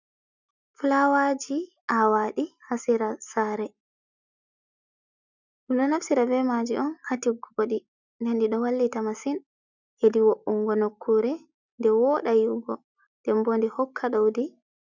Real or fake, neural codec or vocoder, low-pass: real; none; 7.2 kHz